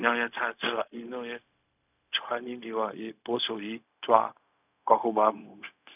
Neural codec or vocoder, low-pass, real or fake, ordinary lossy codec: codec, 16 kHz, 0.4 kbps, LongCat-Audio-Codec; 3.6 kHz; fake; none